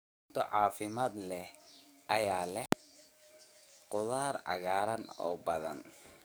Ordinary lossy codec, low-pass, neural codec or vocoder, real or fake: none; none; codec, 44.1 kHz, 7.8 kbps, DAC; fake